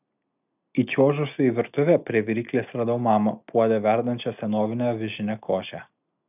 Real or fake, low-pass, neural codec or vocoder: real; 3.6 kHz; none